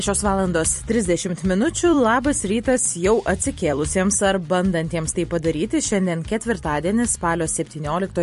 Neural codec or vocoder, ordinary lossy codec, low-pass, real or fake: vocoder, 44.1 kHz, 128 mel bands every 256 samples, BigVGAN v2; MP3, 48 kbps; 14.4 kHz; fake